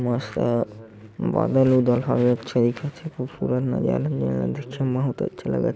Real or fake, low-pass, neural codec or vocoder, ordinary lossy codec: real; none; none; none